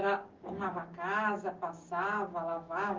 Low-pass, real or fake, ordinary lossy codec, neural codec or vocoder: 7.2 kHz; fake; Opus, 32 kbps; codec, 16 kHz, 6 kbps, DAC